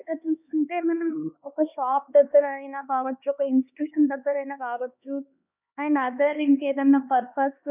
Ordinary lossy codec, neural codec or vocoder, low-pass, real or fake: none; codec, 16 kHz, 2 kbps, X-Codec, HuBERT features, trained on LibriSpeech; 3.6 kHz; fake